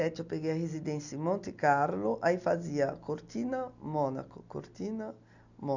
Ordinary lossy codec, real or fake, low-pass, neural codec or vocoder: none; real; 7.2 kHz; none